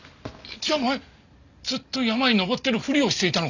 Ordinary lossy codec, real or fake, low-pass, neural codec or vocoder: none; real; 7.2 kHz; none